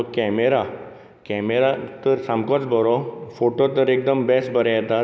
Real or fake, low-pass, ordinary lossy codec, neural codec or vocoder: real; none; none; none